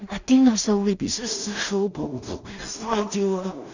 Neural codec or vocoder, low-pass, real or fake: codec, 16 kHz in and 24 kHz out, 0.4 kbps, LongCat-Audio-Codec, two codebook decoder; 7.2 kHz; fake